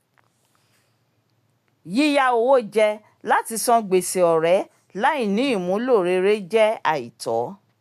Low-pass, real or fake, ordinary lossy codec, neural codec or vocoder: 14.4 kHz; real; none; none